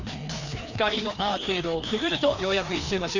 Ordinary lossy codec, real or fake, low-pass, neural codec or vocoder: none; fake; 7.2 kHz; codec, 16 kHz, 2 kbps, FreqCodec, larger model